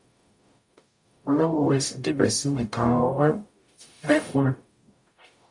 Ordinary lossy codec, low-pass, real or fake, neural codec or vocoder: MP3, 64 kbps; 10.8 kHz; fake; codec, 44.1 kHz, 0.9 kbps, DAC